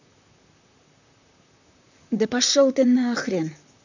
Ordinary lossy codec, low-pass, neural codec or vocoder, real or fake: none; 7.2 kHz; vocoder, 44.1 kHz, 128 mel bands, Pupu-Vocoder; fake